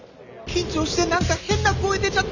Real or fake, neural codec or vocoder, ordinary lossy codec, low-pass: real; none; none; 7.2 kHz